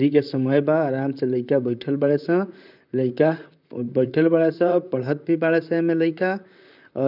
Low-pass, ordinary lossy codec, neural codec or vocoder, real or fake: 5.4 kHz; none; vocoder, 44.1 kHz, 128 mel bands, Pupu-Vocoder; fake